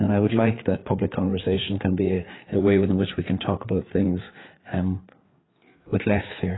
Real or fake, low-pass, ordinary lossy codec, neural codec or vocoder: fake; 7.2 kHz; AAC, 16 kbps; codec, 16 kHz, 4 kbps, FreqCodec, larger model